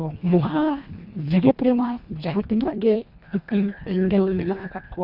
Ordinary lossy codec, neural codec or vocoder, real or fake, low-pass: none; codec, 24 kHz, 1.5 kbps, HILCodec; fake; 5.4 kHz